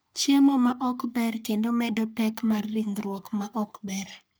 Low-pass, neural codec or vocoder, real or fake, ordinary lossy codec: none; codec, 44.1 kHz, 3.4 kbps, Pupu-Codec; fake; none